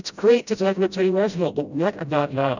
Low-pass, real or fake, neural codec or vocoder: 7.2 kHz; fake; codec, 16 kHz, 0.5 kbps, FreqCodec, smaller model